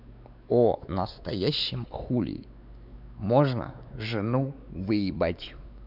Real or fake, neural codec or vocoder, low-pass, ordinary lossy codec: fake; codec, 16 kHz, 4 kbps, X-Codec, WavLM features, trained on Multilingual LibriSpeech; 5.4 kHz; none